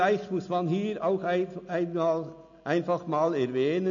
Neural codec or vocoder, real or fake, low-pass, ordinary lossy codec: none; real; 7.2 kHz; none